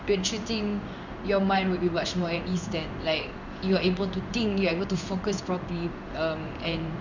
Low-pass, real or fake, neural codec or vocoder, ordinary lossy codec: 7.2 kHz; fake; codec, 16 kHz in and 24 kHz out, 1 kbps, XY-Tokenizer; none